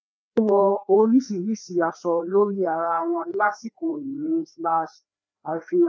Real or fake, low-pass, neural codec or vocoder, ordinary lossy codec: fake; none; codec, 16 kHz, 2 kbps, FreqCodec, larger model; none